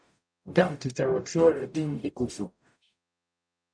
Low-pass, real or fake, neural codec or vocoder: 9.9 kHz; fake; codec, 44.1 kHz, 0.9 kbps, DAC